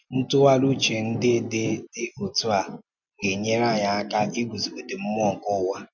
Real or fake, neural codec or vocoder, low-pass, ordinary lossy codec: real; none; 7.2 kHz; none